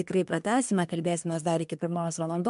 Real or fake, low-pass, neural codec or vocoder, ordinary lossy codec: fake; 10.8 kHz; codec, 24 kHz, 1 kbps, SNAC; MP3, 64 kbps